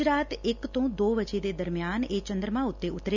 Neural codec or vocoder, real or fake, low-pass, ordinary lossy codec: none; real; 7.2 kHz; none